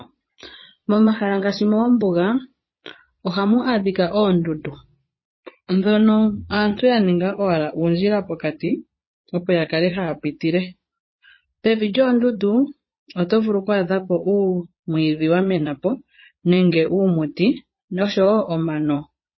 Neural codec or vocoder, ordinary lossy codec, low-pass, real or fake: vocoder, 22.05 kHz, 80 mel bands, Vocos; MP3, 24 kbps; 7.2 kHz; fake